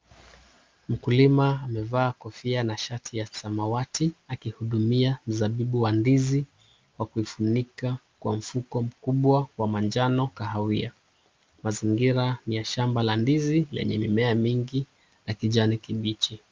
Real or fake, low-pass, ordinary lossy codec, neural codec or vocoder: real; 7.2 kHz; Opus, 24 kbps; none